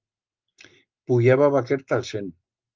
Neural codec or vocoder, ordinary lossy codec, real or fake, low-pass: none; Opus, 32 kbps; real; 7.2 kHz